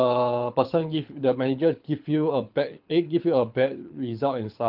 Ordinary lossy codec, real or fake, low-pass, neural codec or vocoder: Opus, 32 kbps; fake; 5.4 kHz; codec, 24 kHz, 6 kbps, HILCodec